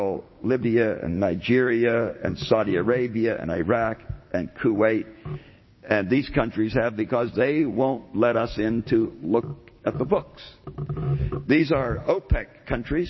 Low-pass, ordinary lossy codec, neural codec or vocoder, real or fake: 7.2 kHz; MP3, 24 kbps; codec, 16 kHz, 6 kbps, DAC; fake